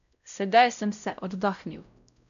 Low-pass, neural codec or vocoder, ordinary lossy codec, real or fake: 7.2 kHz; codec, 16 kHz, 0.5 kbps, X-Codec, WavLM features, trained on Multilingual LibriSpeech; none; fake